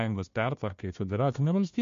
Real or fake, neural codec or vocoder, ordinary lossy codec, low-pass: fake; codec, 16 kHz, 1 kbps, FunCodec, trained on Chinese and English, 50 frames a second; MP3, 48 kbps; 7.2 kHz